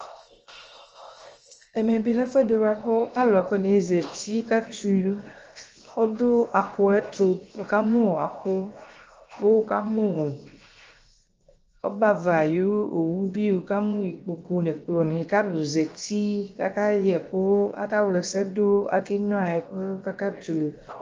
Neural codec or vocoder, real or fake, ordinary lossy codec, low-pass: codec, 16 kHz, 0.7 kbps, FocalCodec; fake; Opus, 24 kbps; 7.2 kHz